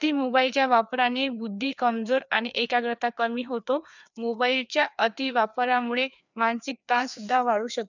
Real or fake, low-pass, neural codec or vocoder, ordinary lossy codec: fake; 7.2 kHz; codec, 16 kHz, 2 kbps, FreqCodec, larger model; none